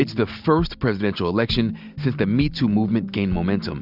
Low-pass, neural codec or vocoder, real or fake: 5.4 kHz; none; real